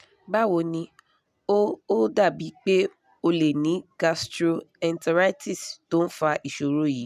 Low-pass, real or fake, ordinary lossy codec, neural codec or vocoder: 14.4 kHz; real; none; none